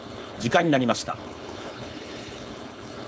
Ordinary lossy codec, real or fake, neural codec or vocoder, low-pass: none; fake; codec, 16 kHz, 4.8 kbps, FACodec; none